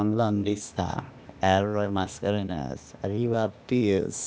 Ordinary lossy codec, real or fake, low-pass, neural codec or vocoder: none; fake; none; codec, 16 kHz, 0.8 kbps, ZipCodec